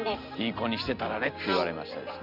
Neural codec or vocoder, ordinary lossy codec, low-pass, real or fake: none; none; 5.4 kHz; real